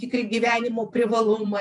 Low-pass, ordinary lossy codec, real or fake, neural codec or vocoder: 10.8 kHz; MP3, 96 kbps; fake; vocoder, 48 kHz, 128 mel bands, Vocos